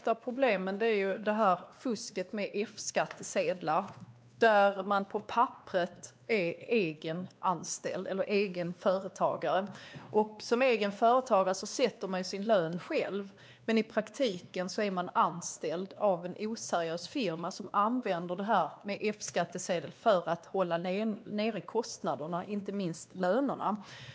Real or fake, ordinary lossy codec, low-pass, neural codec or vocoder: fake; none; none; codec, 16 kHz, 2 kbps, X-Codec, WavLM features, trained on Multilingual LibriSpeech